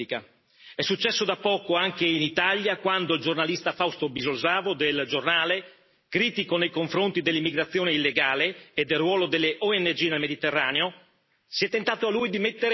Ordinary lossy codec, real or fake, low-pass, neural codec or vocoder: MP3, 24 kbps; real; 7.2 kHz; none